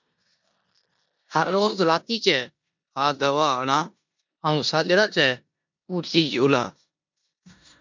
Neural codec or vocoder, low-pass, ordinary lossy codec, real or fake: codec, 16 kHz in and 24 kHz out, 0.9 kbps, LongCat-Audio-Codec, four codebook decoder; 7.2 kHz; MP3, 64 kbps; fake